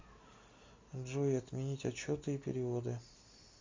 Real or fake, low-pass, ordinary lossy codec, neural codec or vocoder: real; 7.2 kHz; MP3, 64 kbps; none